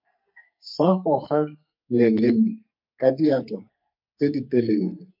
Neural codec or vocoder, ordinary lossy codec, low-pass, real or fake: codec, 44.1 kHz, 2.6 kbps, SNAC; MP3, 48 kbps; 5.4 kHz; fake